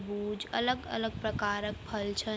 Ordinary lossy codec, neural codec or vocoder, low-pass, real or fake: none; none; none; real